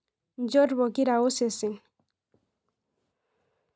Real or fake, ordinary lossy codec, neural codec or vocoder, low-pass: real; none; none; none